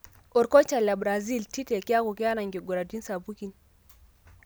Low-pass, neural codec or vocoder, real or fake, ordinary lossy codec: none; none; real; none